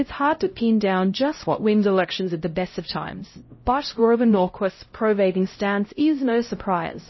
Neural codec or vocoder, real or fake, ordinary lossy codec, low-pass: codec, 16 kHz, 0.5 kbps, X-Codec, HuBERT features, trained on LibriSpeech; fake; MP3, 24 kbps; 7.2 kHz